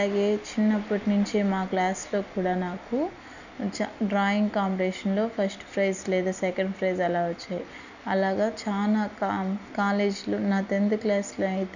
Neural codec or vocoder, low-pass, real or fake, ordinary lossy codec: none; 7.2 kHz; real; none